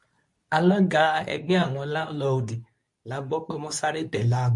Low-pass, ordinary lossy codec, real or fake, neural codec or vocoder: 10.8 kHz; MP3, 48 kbps; fake; codec, 24 kHz, 0.9 kbps, WavTokenizer, medium speech release version 2